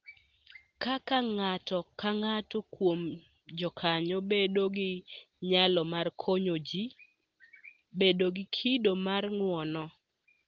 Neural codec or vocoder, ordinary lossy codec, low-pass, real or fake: none; Opus, 16 kbps; 7.2 kHz; real